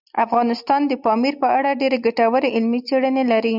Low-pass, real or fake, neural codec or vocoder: 5.4 kHz; real; none